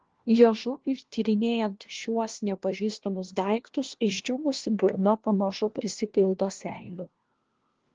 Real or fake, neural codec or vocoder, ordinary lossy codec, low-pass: fake; codec, 16 kHz, 1 kbps, FunCodec, trained on LibriTTS, 50 frames a second; Opus, 16 kbps; 7.2 kHz